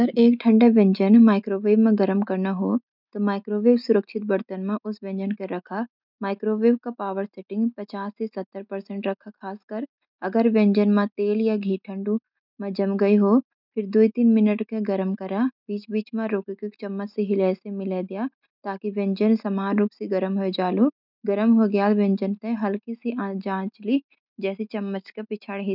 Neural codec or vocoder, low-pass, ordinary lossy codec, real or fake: none; 5.4 kHz; none; real